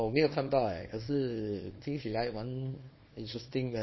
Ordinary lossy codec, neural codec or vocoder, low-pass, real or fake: MP3, 24 kbps; codec, 24 kHz, 0.9 kbps, WavTokenizer, small release; 7.2 kHz; fake